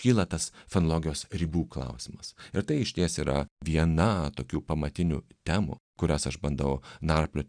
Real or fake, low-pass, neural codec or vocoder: real; 9.9 kHz; none